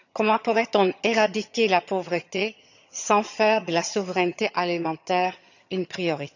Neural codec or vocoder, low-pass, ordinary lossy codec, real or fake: vocoder, 22.05 kHz, 80 mel bands, HiFi-GAN; 7.2 kHz; none; fake